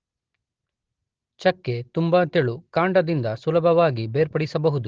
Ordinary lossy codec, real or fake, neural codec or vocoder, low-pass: Opus, 16 kbps; real; none; 7.2 kHz